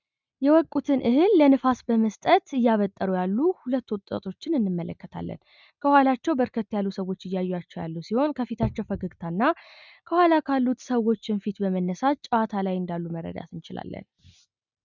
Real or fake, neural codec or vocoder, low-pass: real; none; 7.2 kHz